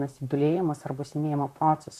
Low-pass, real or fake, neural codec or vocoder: 14.4 kHz; fake; vocoder, 44.1 kHz, 128 mel bands, Pupu-Vocoder